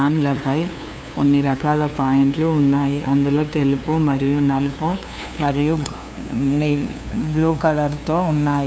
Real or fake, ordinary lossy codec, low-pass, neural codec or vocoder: fake; none; none; codec, 16 kHz, 2 kbps, FunCodec, trained on LibriTTS, 25 frames a second